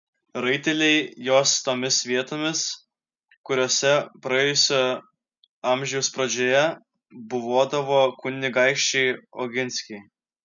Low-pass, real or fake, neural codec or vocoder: 7.2 kHz; real; none